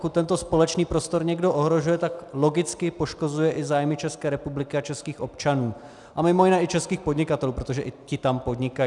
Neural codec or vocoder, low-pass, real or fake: none; 10.8 kHz; real